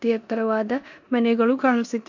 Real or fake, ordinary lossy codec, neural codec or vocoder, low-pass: fake; AAC, 48 kbps; codec, 16 kHz in and 24 kHz out, 0.9 kbps, LongCat-Audio-Codec, four codebook decoder; 7.2 kHz